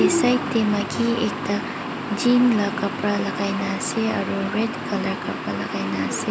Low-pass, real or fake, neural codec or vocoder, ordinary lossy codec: none; real; none; none